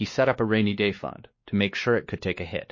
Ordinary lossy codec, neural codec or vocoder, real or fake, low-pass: MP3, 32 kbps; codec, 16 kHz, 2 kbps, X-Codec, WavLM features, trained on Multilingual LibriSpeech; fake; 7.2 kHz